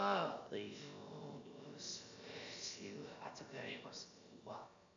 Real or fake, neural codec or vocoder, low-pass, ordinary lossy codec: fake; codec, 16 kHz, about 1 kbps, DyCAST, with the encoder's durations; 7.2 kHz; MP3, 64 kbps